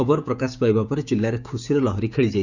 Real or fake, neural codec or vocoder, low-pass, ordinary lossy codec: fake; codec, 16 kHz, 6 kbps, DAC; 7.2 kHz; none